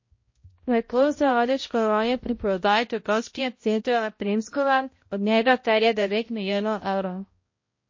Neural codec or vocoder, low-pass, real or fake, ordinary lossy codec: codec, 16 kHz, 0.5 kbps, X-Codec, HuBERT features, trained on balanced general audio; 7.2 kHz; fake; MP3, 32 kbps